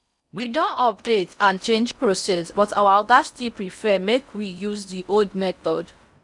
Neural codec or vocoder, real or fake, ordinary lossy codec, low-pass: codec, 16 kHz in and 24 kHz out, 0.6 kbps, FocalCodec, streaming, 4096 codes; fake; none; 10.8 kHz